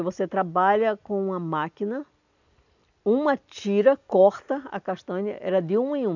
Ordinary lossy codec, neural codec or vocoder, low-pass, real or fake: none; none; 7.2 kHz; real